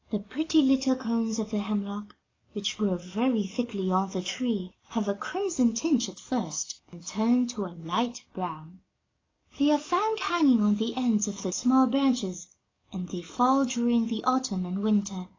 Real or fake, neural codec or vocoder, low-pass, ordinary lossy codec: fake; codec, 44.1 kHz, 7.8 kbps, DAC; 7.2 kHz; AAC, 32 kbps